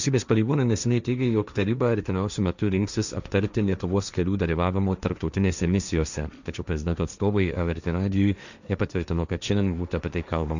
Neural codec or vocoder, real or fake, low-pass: codec, 16 kHz, 1.1 kbps, Voila-Tokenizer; fake; 7.2 kHz